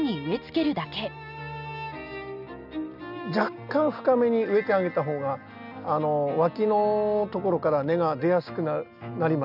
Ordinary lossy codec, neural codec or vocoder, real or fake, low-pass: none; none; real; 5.4 kHz